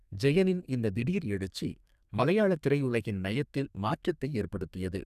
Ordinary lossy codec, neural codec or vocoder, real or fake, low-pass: none; codec, 32 kHz, 1.9 kbps, SNAC; fake; 14.4 kHz